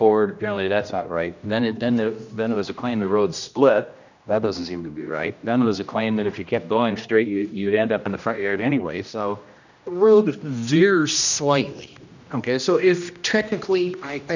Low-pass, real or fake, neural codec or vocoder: 7.2 kHz; fake; codec, 16 kHz, 1 kbps, X-Codec, HuBERT features, trained on general audio